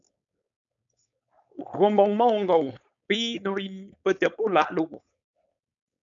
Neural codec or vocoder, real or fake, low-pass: codec, 16 kHz, 4.8 kbps, FACodec; fake; 7.2 kHz